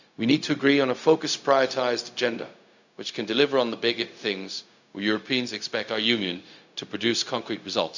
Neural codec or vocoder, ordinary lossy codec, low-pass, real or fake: codec, 16 kHz, 0.4 kbps, LongCat-Audio-Codec; none; 7.2 kHz; fake